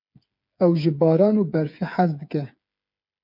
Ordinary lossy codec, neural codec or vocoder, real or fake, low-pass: MP3, 48 kbps; codec, 16 kHz, 8 kbps, FreqCodec, smaller model; fake; 5.4 kHz